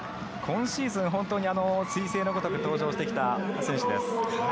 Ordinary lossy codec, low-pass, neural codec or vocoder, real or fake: none; none; none; real